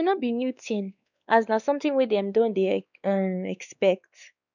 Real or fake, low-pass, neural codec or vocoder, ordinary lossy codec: fake; 7.2 kHz; codec, 16 kHz, 2 kbps, X-Codec, WavLM features, trained on Multilingual LibriSpeech; none